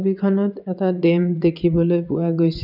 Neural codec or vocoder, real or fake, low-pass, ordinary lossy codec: vocoder, 22.05 kHz, 80 mel bands, WaveNeXt; fake; 5.4 kHz; none